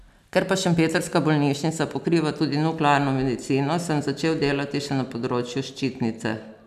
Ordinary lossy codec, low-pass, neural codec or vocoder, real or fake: none; 14.4 kHz; none; real